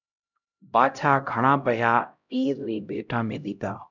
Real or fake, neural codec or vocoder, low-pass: fake; codec, 16 kHz, 0.5 kbps, X-Codec, HuBERT features, trained on LibriSpeech; 7.2 kHz